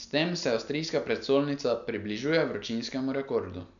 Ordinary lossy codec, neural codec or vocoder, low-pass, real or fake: none; none; 7.2 kHz; real